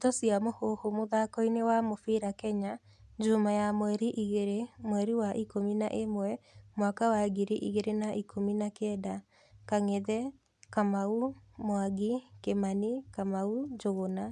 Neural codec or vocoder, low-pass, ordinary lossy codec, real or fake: none; none; none; real